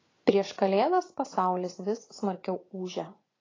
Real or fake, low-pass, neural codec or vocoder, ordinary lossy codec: fake; 7.2 kHz; vocoder, 24 kHz, 100 mel bands, Vocos; AAC, 32 kbps